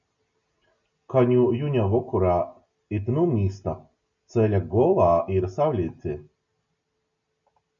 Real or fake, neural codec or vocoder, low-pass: real; none; 7.2 kHz